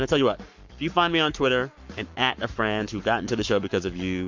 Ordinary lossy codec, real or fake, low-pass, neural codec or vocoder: MP3, 48 kbps; fake; 7.2 kHz; codec, 44.1 kHz, 7.8 kbps, Pupu-Codec